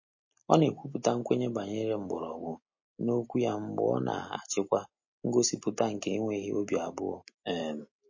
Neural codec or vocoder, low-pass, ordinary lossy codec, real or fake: none; 7.2 kHz; MP3, 32 kbps; real